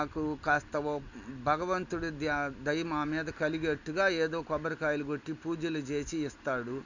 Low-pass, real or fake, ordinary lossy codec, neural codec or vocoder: 7.2 kHz; real; none; none